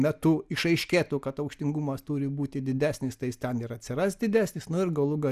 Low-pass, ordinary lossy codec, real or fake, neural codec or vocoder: 14.4 kHz; Opus, 64 kbps; real; none